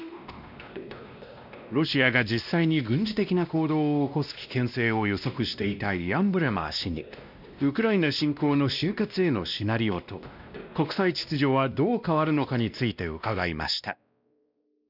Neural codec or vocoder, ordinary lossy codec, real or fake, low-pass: codec, 16 kHz, 1 kbps, X-Codec, WavLM features, trained on Multilingual LibriSpeech; none; fake; 5.4 kHz